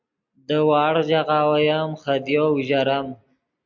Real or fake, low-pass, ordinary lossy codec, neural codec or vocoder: real; 7.2 kHz; MP3, 48 kbps; none